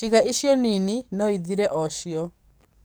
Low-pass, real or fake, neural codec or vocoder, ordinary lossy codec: none; fake; codec, 44.1 kHz, 7.8 kbps, DAC; none